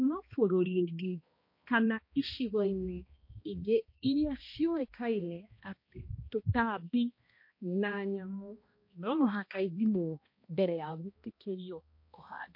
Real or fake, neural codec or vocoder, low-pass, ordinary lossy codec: fake; codec, 16 kHz, 1 kbps, X-Codec, HuBERT features, trained on balanced general audio; 5.4 kHz; MP3, 32 kbps